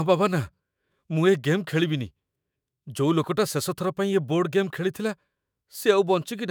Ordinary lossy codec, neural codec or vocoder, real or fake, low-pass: none; autoencoder, 48 kHz, 128 numbers a frame, DAC-VAE, trained on Japanese speech; fake; none